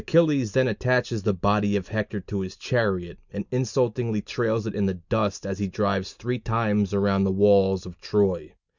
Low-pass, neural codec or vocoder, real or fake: 7.2 kHz; none; real